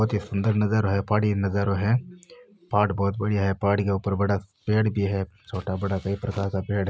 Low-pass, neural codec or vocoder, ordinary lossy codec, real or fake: none; none; none; real